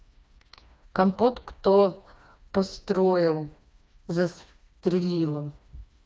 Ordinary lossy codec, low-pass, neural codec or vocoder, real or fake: none; none; codec, 16 kHz, 2 kbps, FreqCodec, smaller model; fake